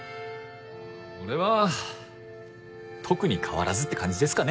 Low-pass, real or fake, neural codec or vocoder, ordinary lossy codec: none; real; none; none